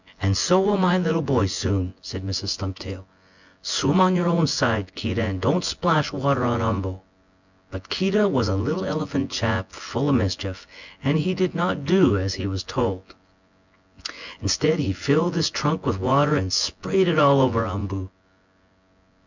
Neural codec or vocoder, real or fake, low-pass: vocoder, 24 kHz, 100 mel bands, Vocos; fake; 7.2 kHz